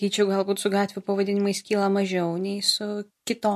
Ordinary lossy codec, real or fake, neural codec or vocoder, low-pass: MP3, 64 kbps; real; none; 14.4 kHz